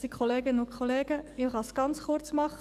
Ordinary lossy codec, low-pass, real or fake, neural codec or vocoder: none; 14.4 kHz; fake; codec, 44.1 kHz, 7.8 kbps, DAC